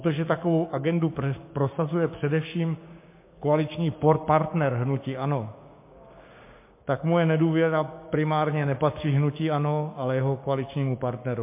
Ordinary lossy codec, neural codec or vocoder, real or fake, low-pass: MP3, 24 kbps; codec, 16 kHz, 6 kbps, DAC; fake; 3.6 kHz